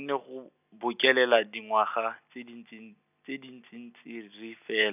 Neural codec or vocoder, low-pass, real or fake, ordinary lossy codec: none; 3.6 kHz; real; none